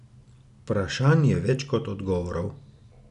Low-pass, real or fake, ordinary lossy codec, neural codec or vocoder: 10.8 kHz; real; none; none